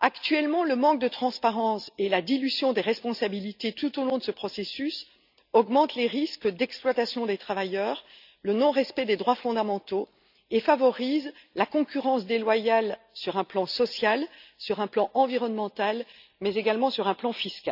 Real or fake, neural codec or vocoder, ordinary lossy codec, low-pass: real; none; none; 5.4 kHz